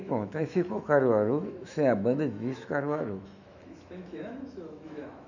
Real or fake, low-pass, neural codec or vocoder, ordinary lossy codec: fake; 7.2 kHz; autoencoder, 48 kHz, 128 numbers a frame, DAC-VAE, trained on Japanese speech; none